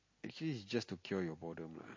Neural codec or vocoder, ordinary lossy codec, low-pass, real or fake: none; MP3, 32 kbps; 7.2 kHz; real